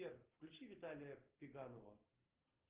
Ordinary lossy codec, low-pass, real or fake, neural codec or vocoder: Opus, 16 kbps; 3.6 kHz; real; none